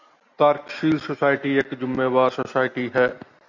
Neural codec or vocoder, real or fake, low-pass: none; real; 7.2 kHz